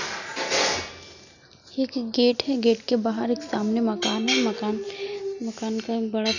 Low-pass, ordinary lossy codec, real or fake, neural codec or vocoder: 7.2 kHz; none; real; none